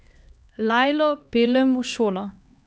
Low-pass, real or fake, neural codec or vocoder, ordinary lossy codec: none; fake; codec, 16 kHz, 2 kbps, X-Codec, HuBERT features, trained on LibriSpeech; none